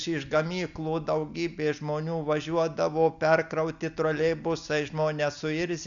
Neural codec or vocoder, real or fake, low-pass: none; real; 7.2 kHz